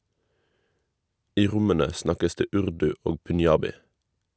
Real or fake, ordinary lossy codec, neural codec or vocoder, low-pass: real; none; none; none